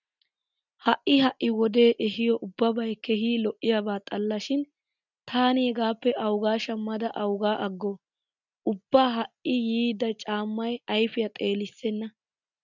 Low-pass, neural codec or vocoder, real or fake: 7.2 kHz; none; real